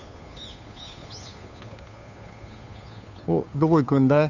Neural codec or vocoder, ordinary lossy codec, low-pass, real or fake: codec, 16 kHz, 2 kbps, FunCodec, trained on Chinese and English, 25 frames a second; none; 7.2 kHz; fake